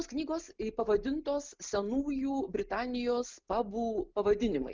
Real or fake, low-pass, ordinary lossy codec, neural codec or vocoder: real; 7.2 kHz; Opus, 24 kbps; none